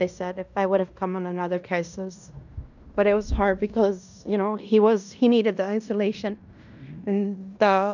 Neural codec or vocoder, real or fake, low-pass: codec, 16 kHz in and 24 kHz out, 0.9 kbps, LongCat-Audio-Codec, fine tuned four codebook decoder; fake; 7.2 kHz